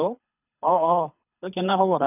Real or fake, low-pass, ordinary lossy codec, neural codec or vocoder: fake; 3.6 kHz; none; codec, 24 kHz, 3 kbps, HILCodec